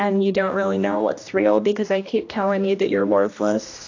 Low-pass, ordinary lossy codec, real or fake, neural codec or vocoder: 7.2 kHz; AAC, 48 kbps; fake; codec, 16 kHz, 1 kbps, X-Codec, HuBERT features, trained on general audio